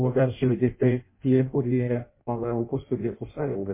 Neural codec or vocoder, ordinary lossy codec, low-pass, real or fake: codec, 16 kHz in and 24 kHz out, 0.6 kbps, FireRedTTS-2 codec; MP3, 24 kbps; 3.6 kHz; fake